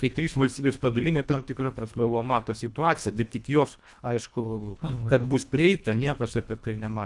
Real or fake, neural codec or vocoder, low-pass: fake; codec, 24 kHz, 1.5 kbps, HILCodec; 10.8 kHz